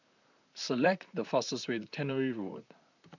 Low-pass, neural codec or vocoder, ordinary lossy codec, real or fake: 7.2 kHz; vocoder, 44.1 kHz, 128 mel bands, Pupu-Vocoder; none; fake